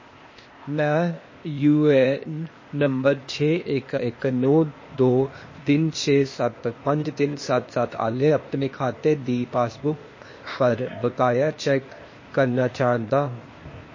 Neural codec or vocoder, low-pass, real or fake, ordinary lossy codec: codec, 16 kHz, 0.8 kbps, ZipCodec; 7.2 kHz; fake; MP3, 32 kbps